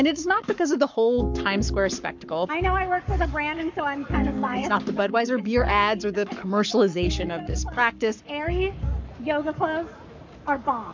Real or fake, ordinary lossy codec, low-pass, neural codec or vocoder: fake; MP3, 64 kbps; 7.2 kHz; codec, 44.1 kHz, 7.8 kbps, Pupu-Codec